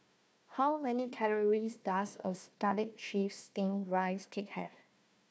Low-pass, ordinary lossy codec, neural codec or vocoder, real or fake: none; none; codec, 16 kHz, 1 kbps, FunCodec, trained on Chinese and English, 50 frames a second; fake